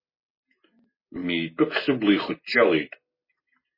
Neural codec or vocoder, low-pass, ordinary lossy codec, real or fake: none; 5.4 kHz; MP3, 24 kbps; real